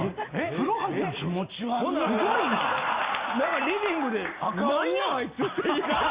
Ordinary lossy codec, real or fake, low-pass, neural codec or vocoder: Opus, 64 kbps; real; 3.6 kHz; none